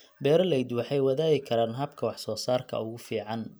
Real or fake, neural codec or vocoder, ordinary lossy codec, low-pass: real; none; none; none